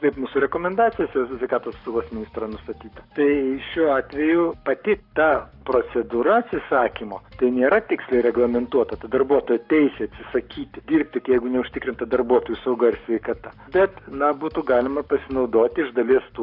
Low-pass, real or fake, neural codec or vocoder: 5.4 kHz; fake; codec, 44.1 kHz, 7.8 kbps, Pupu-Codec